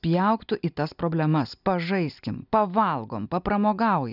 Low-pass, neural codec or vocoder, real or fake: 5.4 kHz; none; real